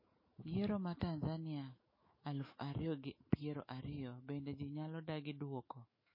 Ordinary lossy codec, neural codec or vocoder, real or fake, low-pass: MP3, 24 kbps; autoencoder, 48 kHz, 128 numbers a frame, DAC-VAE, trained on Japanese speech; fake; 5.4 kHz